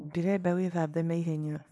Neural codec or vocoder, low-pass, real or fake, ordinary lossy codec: codec, 24 kHz, 0.9 kbps, WavTokenizer, small release; none; fake; none